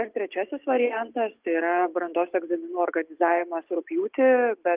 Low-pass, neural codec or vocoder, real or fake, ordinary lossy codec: 3.6 kHz; none; real; Opus, 32 kbps